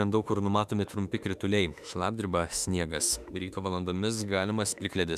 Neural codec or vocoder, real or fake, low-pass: autoencoder, 48 kHz, 32 numbers a frame, DAC-VAE, trained on Japanese speech; fake; 14.4 kHz